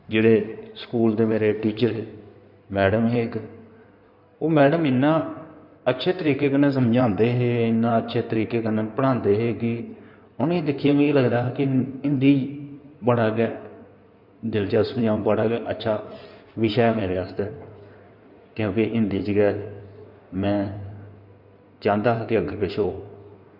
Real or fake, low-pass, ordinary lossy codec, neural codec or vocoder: fake; 5.4 kHz; none; codec, 16 kHz in and 24 kHz out, 2.2 kbps, FireRedTTS-2 codec